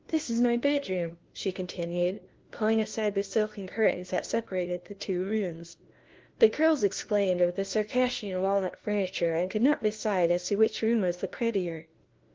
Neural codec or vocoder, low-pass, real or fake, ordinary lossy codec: codec, 16 kHz, 1 kbps, FunCodec, trained on LibriTTS, 50 frames a second; 7.2 kHz; fake; Opus, 16 kbps